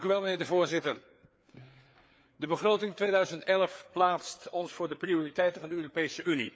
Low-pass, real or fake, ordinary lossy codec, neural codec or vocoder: none; fake; none; codec, 16 kHz, 4 kbps, FreqCodec, larger model